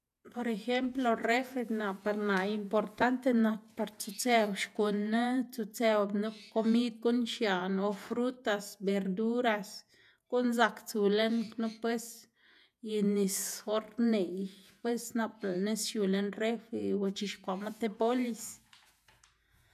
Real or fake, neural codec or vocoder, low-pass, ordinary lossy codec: fake; vocoder, 48 kHz, 128 mel bands, Vocos; 14.4 kHz; none